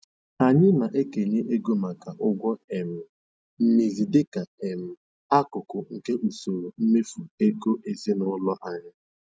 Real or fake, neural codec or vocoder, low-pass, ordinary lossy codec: real; none; none; none